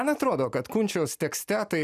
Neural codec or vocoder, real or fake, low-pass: none; real; 14.4 kHz